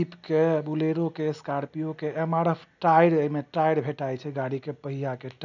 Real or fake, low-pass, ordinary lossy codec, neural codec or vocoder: real; 7.2 kHz; none; none